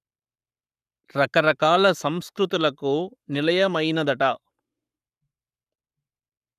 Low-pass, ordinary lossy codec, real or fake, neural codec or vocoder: 14.4 kHz; none; fake; codec, 44.1 kHz, 7.8 kbps, Pupu-Codec